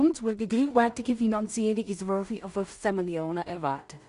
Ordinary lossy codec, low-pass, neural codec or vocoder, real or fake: none; 10.8 kHz; codec, 16 kHz in and 24 kHz out, 0.4 kbps, LongCat-Audio-Codec, two codebook decoder; fake